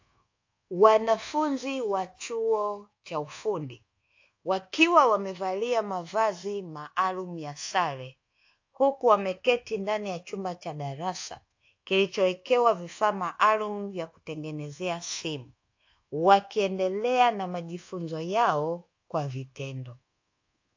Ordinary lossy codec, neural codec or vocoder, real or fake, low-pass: AAC, 48 kbps; codec, 24 kHz, 1.2 kbps, DualCodec; fake; 7.2 kHz